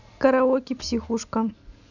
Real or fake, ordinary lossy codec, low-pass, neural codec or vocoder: real; none; 7.2 kHz; none